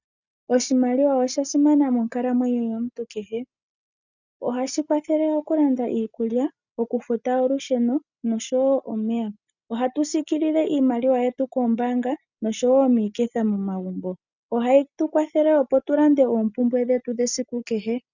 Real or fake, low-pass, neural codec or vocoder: real; 7.2 kHz; none